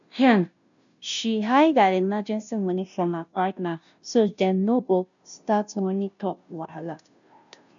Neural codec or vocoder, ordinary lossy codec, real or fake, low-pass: codec, 16 kHz, 0.5 kbps, FunCodec, trained on Chinese and English, 25 frames a second; none; fake; 7.2 kHz